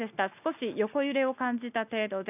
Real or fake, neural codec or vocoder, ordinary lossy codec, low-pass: real; none; none; 3.6 kHz